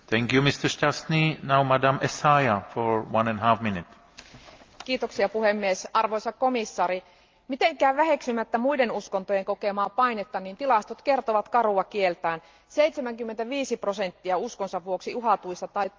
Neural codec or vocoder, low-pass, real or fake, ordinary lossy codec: none; 7.2 kHz; real; Opus, 24 kbps